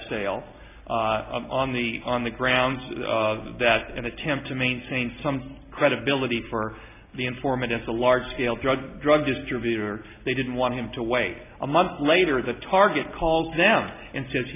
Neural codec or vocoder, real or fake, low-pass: none; real; 3.6 kHz